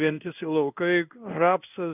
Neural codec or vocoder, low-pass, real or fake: codec, 16 kHz in and 24 kHz out, 1 kbps, XY-Tokenizer; 3.6 kHz; fake